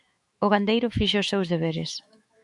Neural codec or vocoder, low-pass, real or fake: autoencoder, 48 kHz, 128 numbers a frame, DAC-VAE, trained on Japanese speech; 10.8 kHz; fake